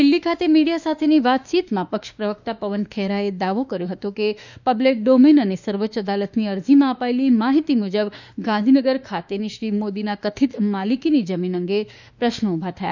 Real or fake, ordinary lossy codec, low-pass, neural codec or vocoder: fake; none; 7.2 kHz; autoencoder, 48 kHz, 32 numbers a frame, DAC-VAE, trained on Japanese speech